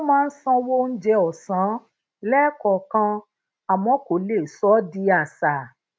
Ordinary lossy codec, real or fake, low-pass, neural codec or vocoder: none; real; none; none